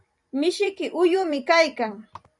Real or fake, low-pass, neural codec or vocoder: fake; 10.8 kHz; vocoder, 44.1 kHz, 128 mel bands every 256 samples, BigVGAN v2